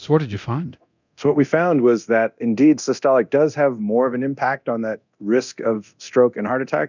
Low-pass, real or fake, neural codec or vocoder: 7.2 kHz; fake; codec, 24 kHz, 0.9 kbps, DualCodec